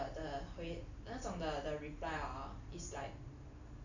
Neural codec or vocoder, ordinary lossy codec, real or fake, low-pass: none; none; real; 7.2 kHz